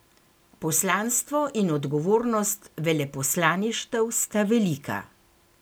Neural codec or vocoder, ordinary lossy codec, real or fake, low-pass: none; none; real; none